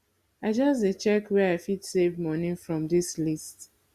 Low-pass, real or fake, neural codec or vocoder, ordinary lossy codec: 14.4 kHz; real; none; Opus, 64 kbps